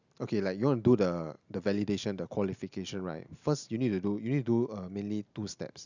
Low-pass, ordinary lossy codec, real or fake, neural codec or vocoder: 7.2 kHz; none; real; none